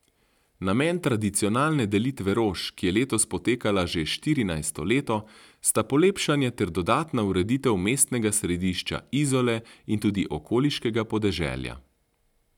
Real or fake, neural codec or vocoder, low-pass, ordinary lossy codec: real; none; 19.8 kHz; none